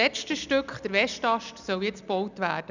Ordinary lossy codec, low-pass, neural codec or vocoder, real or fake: none; 7.2 kHz; none; real